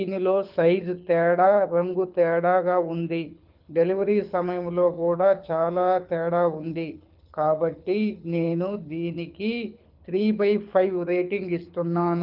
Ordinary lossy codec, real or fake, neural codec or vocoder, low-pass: Opus, 32 kbps; fake; codec, 24 kHz, 6 kbps, HILCodec; 5.4 kHz